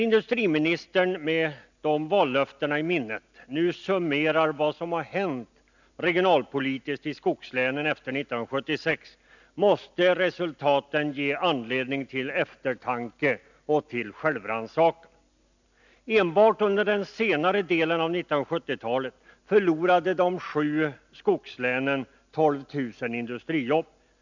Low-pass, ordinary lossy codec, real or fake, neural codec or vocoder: 7.2 kHz; none; real; none